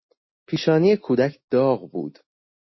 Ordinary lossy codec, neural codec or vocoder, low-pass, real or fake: MP3, 24 kbps; none; 7.2 kHz; real